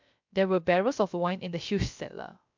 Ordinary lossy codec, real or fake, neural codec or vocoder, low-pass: MP3, 64 kbps; fake; codec, 16 kHz, 0.3 kbps, FocalCodec; 7.2 kHz